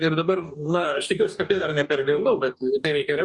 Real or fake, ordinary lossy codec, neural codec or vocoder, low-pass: fake; Opus, 64 kbps; codec, 44.1 kHz, 2.6 kbps, DAC; 10.8 kHz